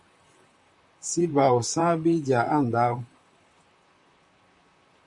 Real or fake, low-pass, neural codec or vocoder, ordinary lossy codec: fake; 10.8 kHz; vocoder, 44.1 kHz, 128 mel bands, Pupu-Vocoder; MP3, 64 kbps